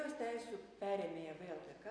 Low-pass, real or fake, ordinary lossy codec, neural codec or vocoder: 9.9 kHz; real; AAC, 64 kbps; none